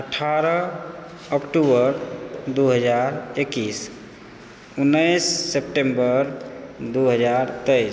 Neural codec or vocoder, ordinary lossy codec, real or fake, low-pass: none; none; real; none